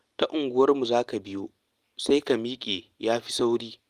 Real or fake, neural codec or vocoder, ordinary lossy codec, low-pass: real; none; Opus, 32 kbps; 14.4 kHz